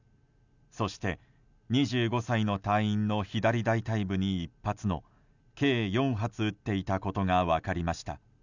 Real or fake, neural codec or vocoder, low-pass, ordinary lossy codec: real; none; 7.2 kHz; none